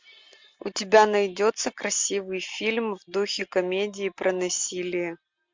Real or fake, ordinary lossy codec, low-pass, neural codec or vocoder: real; MP3, 64 kbps; 7.2 kHz; none